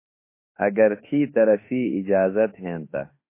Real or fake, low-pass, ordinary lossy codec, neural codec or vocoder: fake; 3.6 kHz; MP3, 24 kbps; autoencoder, 48 kHz, 32 numbers a frame, DAC-VAE, trained on Japanese speech